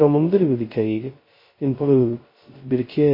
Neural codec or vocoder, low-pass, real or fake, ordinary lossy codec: codec, 16 kHz, 0.2 kbps, FocalCodec; 5.4 kHz; fake; MP3, 24 kbps